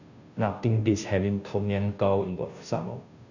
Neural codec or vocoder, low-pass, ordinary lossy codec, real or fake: codec, 16 kHz, 0.5 kbps, FunCodec, trained on Chinese and English, 25 frames a second; 7.2 kHz; none; fake